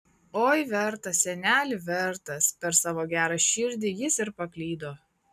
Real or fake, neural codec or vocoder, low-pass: real; none; 14.4 kHz